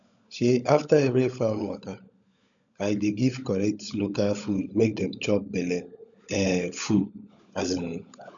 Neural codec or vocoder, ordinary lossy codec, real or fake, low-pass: codec, 16 kHz, 16 kbps, FunCodec, trained on LibriTTS, 50 frames a second; none; fake; 7.2 kHz